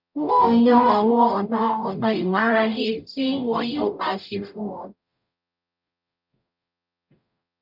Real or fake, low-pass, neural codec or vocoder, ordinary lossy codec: fake; 5.4 kHz; codec, 44.1 kHz, 0.9 kbps, DAC; none